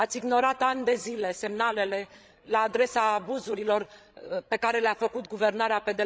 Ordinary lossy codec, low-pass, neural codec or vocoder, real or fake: none; none; codec, 16 kHz, 16 kbps, FreqCodec, larger model; fake